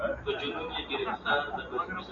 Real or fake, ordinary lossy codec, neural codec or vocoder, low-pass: real; MP3, 48 kbps; none; 7.2 kHz